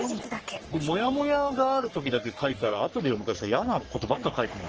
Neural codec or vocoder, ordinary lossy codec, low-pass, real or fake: codec, 44.1 kHz, 3.4 kbps, Pupu-Codec; Opus, 16 kbps; 7.2 kHz; fake